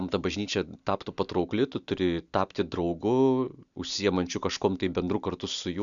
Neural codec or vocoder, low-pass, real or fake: none; 7.2 kHz; real